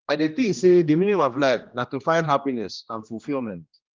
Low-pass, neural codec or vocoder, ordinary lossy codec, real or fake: 7.2 kHz; codec, 16 kHz, 1 kbps, X-Codec, HuBERT features, trained on general audio; Opus, 24 kbps; fake